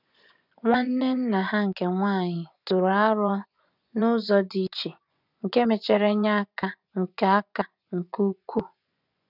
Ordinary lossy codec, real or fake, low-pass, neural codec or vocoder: none; fake; 5.4 kHz; vocoder, 24 kHz, 100 mel bands, Vocos